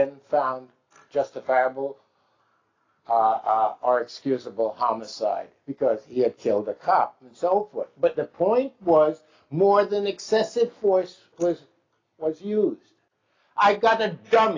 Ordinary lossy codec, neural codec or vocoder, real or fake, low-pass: AAC, 32 kbps; none; real; 7.2 kHz